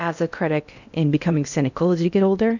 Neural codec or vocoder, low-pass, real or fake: codec, 16 kHz in and 24 kHz out, 0.6 kbps, FocalCodec, streaming, 2048 codes; 7.2 kHz; fake